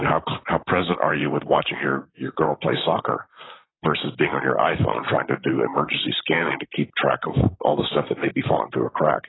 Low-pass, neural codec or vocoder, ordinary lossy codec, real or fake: 7.2 kHz; vocoder, 44.1 kHz, 128 mel bands, Pupu-Vocoder; AAC, 16 kbps; fake